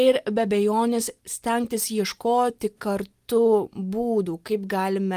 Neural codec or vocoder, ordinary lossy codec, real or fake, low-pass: none; Opus, 32 kbps; real; 14.4 kHz